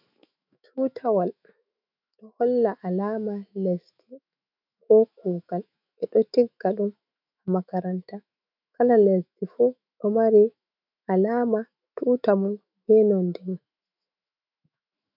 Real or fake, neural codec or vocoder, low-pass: fake; autoencoder, 48 kHz, 128 numbers a frame, DAC-VAE, trained on Japanese speech; 5.4 kHz